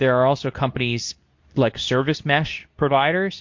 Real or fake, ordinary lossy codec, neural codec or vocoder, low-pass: fake; MP3, 48 kbps; codec, 24 kHz, 0.9 kbps, WavTokenizer, medium speech release version 2; 7.2 kHz